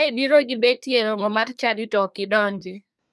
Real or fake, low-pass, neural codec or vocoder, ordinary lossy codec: fake; none; codec, 24 kHz, 1 kbps, SNAC; none